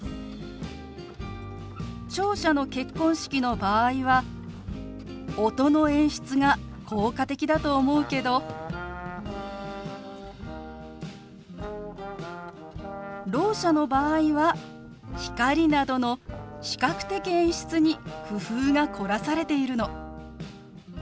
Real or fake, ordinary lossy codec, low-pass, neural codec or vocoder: real; none; none; none